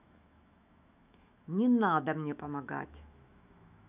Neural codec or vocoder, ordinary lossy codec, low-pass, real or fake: autoencoder, 48 kHz, 128 numbers a frame, DAC-VAE, trained on Japanese speech; none; 3.6 kHz; fake